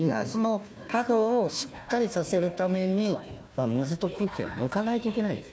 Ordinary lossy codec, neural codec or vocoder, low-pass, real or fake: none; codec, 16 kHz, 1 kbps, FunCodec, trained on Chinese and English, 50 frames a second; none; fake